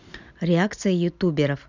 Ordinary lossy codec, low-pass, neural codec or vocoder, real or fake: none; 7.2 kHz; none; real